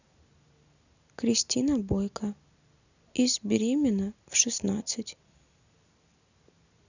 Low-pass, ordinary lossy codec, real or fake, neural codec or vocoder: 7.2 kHz; none; real; none